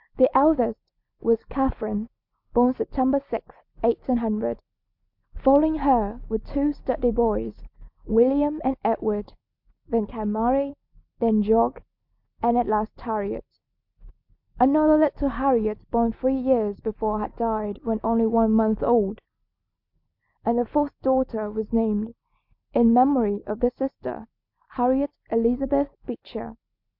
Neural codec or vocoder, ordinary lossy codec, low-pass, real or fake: none; MP3, 48 kbps; 5.4 kHz; real